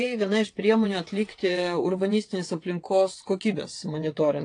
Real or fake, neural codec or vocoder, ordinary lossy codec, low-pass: fake; vocoder, 22.05 kHz, 80 mel bands, WaveNeXt; AAC, 48 kbps; 9.9 kHz